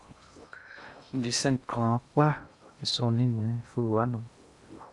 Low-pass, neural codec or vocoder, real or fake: 10.8 kHz; codec, 16 kHz in and 24 kHz out, 0.6 kbps, FocalCodec, streaming, 2048 codes; fake